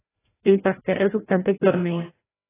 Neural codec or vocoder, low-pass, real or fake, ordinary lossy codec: codec, 16 kHz, 0.5 kbps, FreqCodec, larger model; 3.6 kHz; fake; AAC, 16 kbps